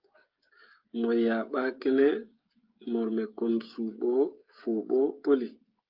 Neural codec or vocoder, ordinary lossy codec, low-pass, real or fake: codec, 16 kHz, 16 kbps, FreqCodec, smaller model; Opus, 24 kbps; 5.4 kHz; fake